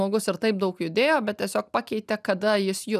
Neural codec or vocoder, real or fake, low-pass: none; real; 14.4 kHz